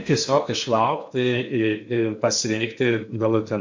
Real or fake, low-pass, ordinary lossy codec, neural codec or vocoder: fake; 7.2 kHz; MP3, 48 kbps; codec, 16 kHz in and 24 kHz out, 0.8 kbps, FocalCodec, streaming, 65536 codes